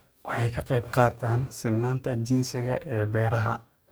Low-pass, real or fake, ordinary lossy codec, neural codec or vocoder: none; fake; none; codec, 44.1 kHz, 2.6 kbps, DAC